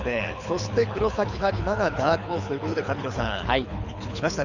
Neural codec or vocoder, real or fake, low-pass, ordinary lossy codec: codec, 24 kHz, 6 kbps, HILCodec; fake; 7.2 kHz; none